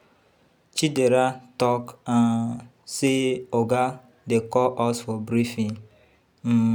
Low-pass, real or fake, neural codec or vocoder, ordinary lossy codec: none; real; none; none